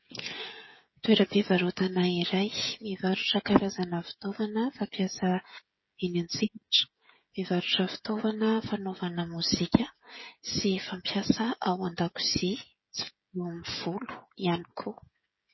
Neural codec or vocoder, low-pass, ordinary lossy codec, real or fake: codec, 16 kHz, 16 kbps, FreqCodec, smaller model; 7.2 kHz; MP3, 24 kbps; fake